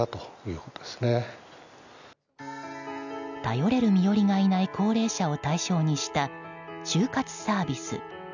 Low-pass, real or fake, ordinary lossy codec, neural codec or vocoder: 7.2 kHz; real; none; none